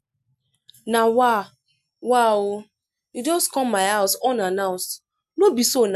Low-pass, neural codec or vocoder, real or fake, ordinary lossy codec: 14.4 kHz; none; real; none